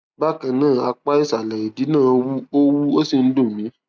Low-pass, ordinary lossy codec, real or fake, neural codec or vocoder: none; none; real; none